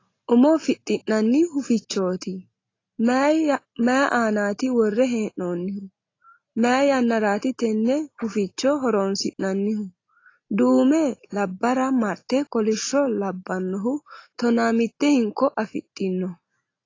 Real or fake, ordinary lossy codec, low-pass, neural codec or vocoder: real; AAC, 32 kbps; 7.2 kHz; none